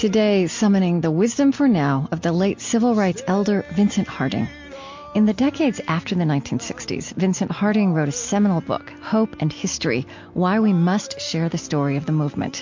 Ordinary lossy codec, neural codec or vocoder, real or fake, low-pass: MP3, 48 kbps; none; real; 7.2 kHz